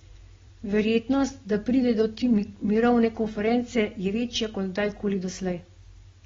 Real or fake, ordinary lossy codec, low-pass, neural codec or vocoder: real; AAC, 24 kbps; 7.2 kHz; none